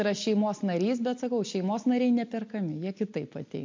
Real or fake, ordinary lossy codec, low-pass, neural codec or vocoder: real; MP3, 48 kbps; 7.2 kHz; none